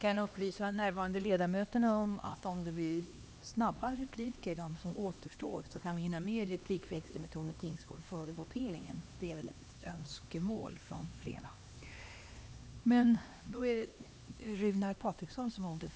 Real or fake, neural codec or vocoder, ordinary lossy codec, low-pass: fake; codec, 16 kHz, 2 kbps, X-Codec, HuBERT features, trained on LibriSpeech; none; none